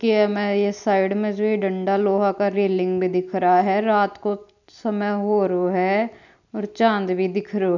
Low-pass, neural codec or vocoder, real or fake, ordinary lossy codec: 7.2 kHz; none; real; none